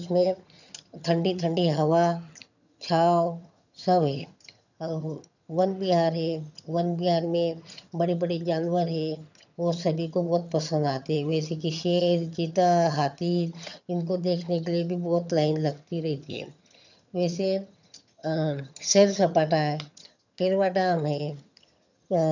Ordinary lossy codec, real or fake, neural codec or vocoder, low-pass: none; fake; vocoder, 22.05 kHz, 80 mel bands, HiFi-GAN; 7.2 kHz